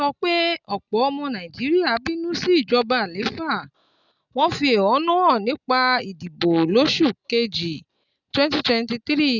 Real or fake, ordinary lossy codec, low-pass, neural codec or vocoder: real; none; 7.2 kHz; none